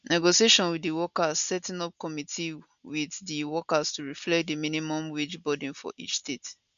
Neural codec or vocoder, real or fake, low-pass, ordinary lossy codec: none; real; 7.2 kHz; none